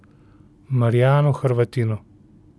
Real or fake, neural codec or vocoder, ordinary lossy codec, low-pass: real; none; none; none